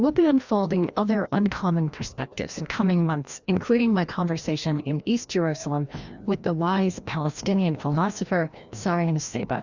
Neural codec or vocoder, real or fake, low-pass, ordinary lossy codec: codec, 16 kHz, 1 kbps, FreqCodec, larger model; fake; 7.2 kHz; Opus, 64 kbps